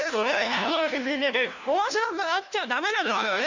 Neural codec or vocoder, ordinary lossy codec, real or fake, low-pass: codec, 16 kHz, 1 kbps, FunCodec, trained on LibriTTS, 50 frames a second; none; fake; 7.2 kHz